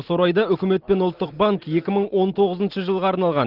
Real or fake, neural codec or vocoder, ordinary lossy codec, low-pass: real; none; Opus, 16 kbps; 5.4 kHz